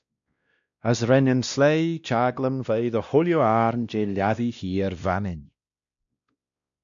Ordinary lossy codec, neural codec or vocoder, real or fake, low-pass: MP3, 96 kbps; codec, 16 kHz, 1 kbps, X-Codec, WavLM features, trained on Multilingual LibriSpeech; fake; 7.2 kHz